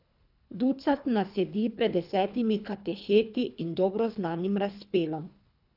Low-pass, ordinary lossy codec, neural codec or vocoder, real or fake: 5.4 kHz; none; codec, 24 kHz, 3 kbps, HILCodec; fake